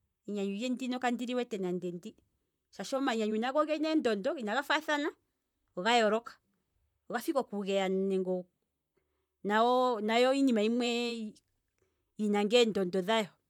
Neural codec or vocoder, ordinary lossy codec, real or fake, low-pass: vocoder, 44.1 kHz, 128 mel bands, Pupu-Vocoder; none; fake; 19.8 kHz